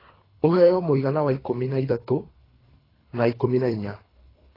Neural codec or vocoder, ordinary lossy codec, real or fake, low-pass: codec, 24 kHz, 3 kbps, HILCodec; AAC, 24 kbps; fake; 5.4 kHz